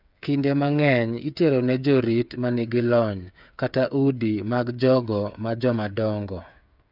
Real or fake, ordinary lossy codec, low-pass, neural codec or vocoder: fake; none; 5.4 kHz; codec, 16 kHz, 8 kbps, FreqCodec, smaller model